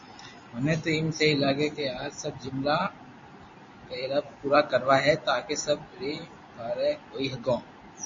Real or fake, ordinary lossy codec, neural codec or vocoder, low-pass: real; MP3, 32 kbps; none; 7.2 kHz